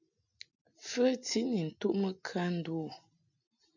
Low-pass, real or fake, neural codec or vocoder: 7.2 kHz; real; none